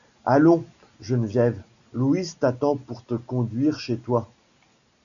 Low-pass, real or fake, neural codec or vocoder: 7.2 kHz; real; none